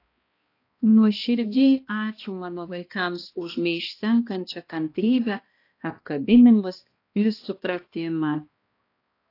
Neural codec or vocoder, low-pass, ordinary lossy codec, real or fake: codec, 16 kHz, 1 kbps, X-Codec, HuBERT features, trained on balanced general audio; 5.4 kHz; AAC, 32 kbps; fake